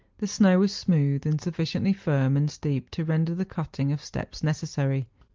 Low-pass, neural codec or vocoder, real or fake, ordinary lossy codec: 7.2 kHz; none; real; Opus, 32 kbps